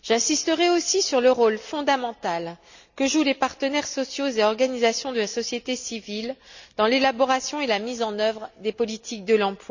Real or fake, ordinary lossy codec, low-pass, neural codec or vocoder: real; none; 7.2 kHz; none